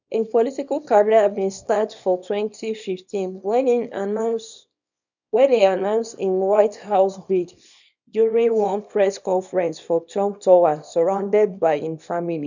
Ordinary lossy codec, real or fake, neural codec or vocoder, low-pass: none; fake; codec, 24 kHz, 0.9 kbps, WavTokenizer, small release; 7.2 kHz